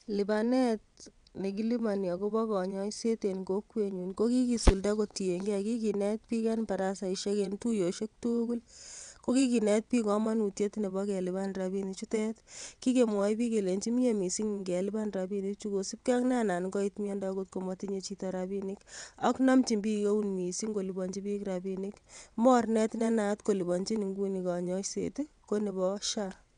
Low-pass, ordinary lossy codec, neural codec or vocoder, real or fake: 9.9 kHz; none; vocoder, 22.05 kHz, 80 mel bands, WaveNeXt; fake